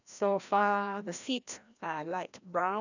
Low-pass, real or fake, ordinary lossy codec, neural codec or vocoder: 7.2 kHz; fake; none; codec, 16 kHz, 1 kbps, FreqCodec, larger model